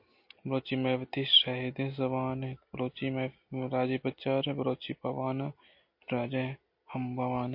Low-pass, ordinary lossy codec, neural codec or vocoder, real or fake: 5.4 kHz; MP3, 32 kbps; none; real